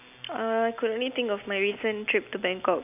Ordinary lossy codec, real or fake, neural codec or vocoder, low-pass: none; real; none; 3.6 kHz